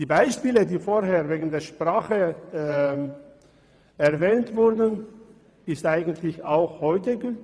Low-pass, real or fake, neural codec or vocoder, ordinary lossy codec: none; fake; vocoder, 22.05 kHz, 80 mel bands, WaveNeXt; none